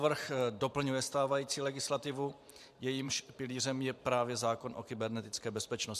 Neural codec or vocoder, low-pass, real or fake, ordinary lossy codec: vocoder, 44.1 kHz, 128 mel bands every 512 samples, BigVGAN v2; 14.4 kHz; fake; MP3, 96 kbps